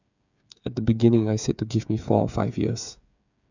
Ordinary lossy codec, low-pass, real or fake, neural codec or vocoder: none; 7.2 kHz; fake; codec, 16 kHz, 8 kbps, FreqCodec, smaller model